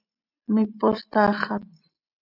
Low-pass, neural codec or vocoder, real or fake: 5.4 kHz; none; real